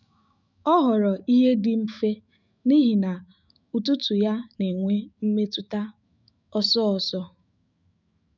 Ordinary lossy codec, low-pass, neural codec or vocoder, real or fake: none; 7.2 kHz; autoencoder, 48 kHz, 128 numbers a frame, DAC-VAE, trained on Japanese speech; fake